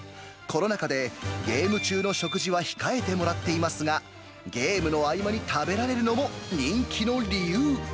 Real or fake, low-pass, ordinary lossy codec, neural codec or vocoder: real; none; none; none